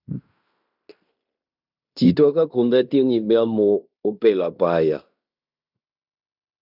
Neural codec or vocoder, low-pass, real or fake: codec, 16 kHz in and 24 kHz out, 0.9 kbps, LongCat-Audio-Codec, fine tuned four codebook decoder; 5.4 kHz; fake